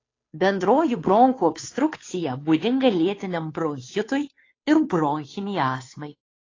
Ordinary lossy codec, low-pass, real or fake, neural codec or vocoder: AAC, 32 kbps; 7.2 kHz; fake; codec, 16 kHz, 2 kbps, FunCodec, trained on Chinese and English, 25 frames a second